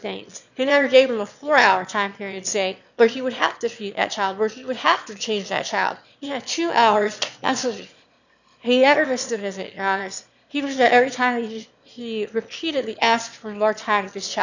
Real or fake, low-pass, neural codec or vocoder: fake; 7.2 kHz; autoencoder, 22.05 kHz, a latent of 192 numbers a frame, VITS, trained on one speaker